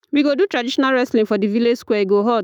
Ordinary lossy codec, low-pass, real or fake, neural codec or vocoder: none; none; fake; autoencoder, 48 kHz, 128 numbers a frame, DAC-VAE, trained on Japanese speech